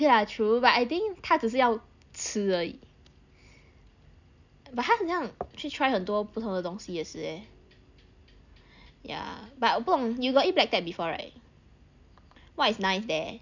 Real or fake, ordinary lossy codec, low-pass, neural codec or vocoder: real; none; 7.2 kHz; none